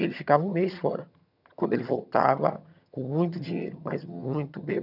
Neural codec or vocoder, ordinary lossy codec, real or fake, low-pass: vocoder, 22.05 kHz, 80 mel bands, HiFi-GAN; none; fake; 5.4 kHz